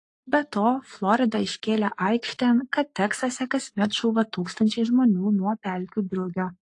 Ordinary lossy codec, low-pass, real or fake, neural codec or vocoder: AAC, 48 kbps; 10.8 kHz; fake; codec, 44.1 kHz, 7.8 kbps, Pupu-Codec